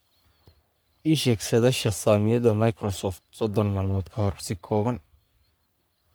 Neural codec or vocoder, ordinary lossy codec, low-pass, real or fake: codec, 44.1 kHz, 3.4 kbps, Pupu-Codec; none; none; fake